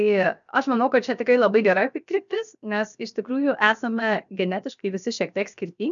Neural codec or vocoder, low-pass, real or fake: codec, 16 kHz, 0.7 kbps, FocalCodec; 7.2 kHz; fake